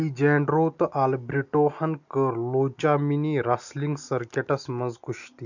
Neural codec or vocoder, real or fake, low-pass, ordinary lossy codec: none; real; 7.2 kHz; none